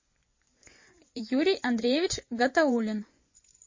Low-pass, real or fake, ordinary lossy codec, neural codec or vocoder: 7.2 kHz; fake; MP3, 32 kbps; vocoder, 24 kHz, 100 mel bands, Vocos